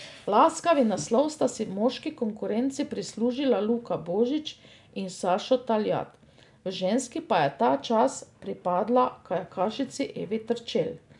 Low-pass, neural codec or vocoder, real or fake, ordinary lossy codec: 10.8 kHz; none; real; none